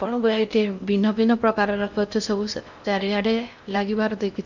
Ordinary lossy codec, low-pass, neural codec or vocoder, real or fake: none; 7.2 kHz; codec, 16 kHz in and 24 kHz out, 0.6 kbps, FocalCodec, streaming, 4096 codes; fake